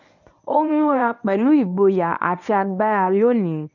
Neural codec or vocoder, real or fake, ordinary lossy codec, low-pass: codec, 24 kHz, 0.9 kbps, WavTokenizer, medium speech release version 1; fake; none; 7.2 kHz